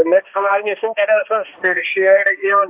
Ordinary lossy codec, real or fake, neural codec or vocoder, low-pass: AAC, 32 kbps; fake; codec, 16 kHz, 1 kbps, X-Codec, HuBERT features, trained on balanced general audio; 3.6 kHz